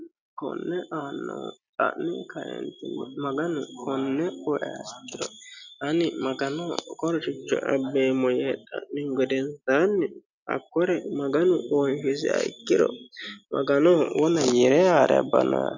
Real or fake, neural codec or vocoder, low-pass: real; none; 7.2 kHz